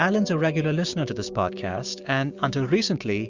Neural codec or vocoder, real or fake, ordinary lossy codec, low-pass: codec, 16 kHz, 6 kbps, DAC; fake; Opus, 64 kbps; 7.2 kHz